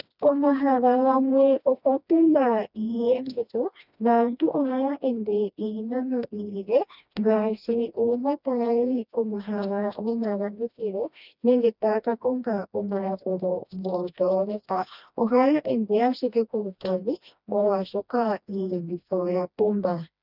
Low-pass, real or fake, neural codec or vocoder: 5.4 kHz; fake; codec, 16 kHz, 1 kbps, FreqCodec, smaller model